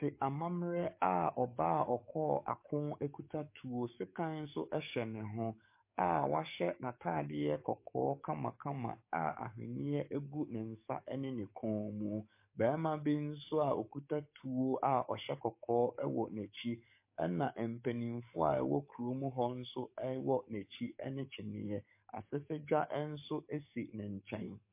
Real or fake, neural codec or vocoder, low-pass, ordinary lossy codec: fake; codec, 44.1 kHz, 7.8 kbps, DAC; 3.6 kHz; MP3, 24 kbps